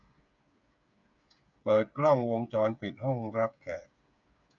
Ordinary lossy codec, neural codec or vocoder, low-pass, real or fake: MP3, 96 kbps; codec, 16 kHz, 8 kbps, FreqCodec, smaller model; 7.2 kHz; fake